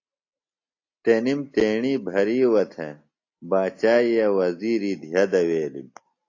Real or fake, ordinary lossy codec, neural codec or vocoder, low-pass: real; AAC, 48 kbps; none; 7.2 kHz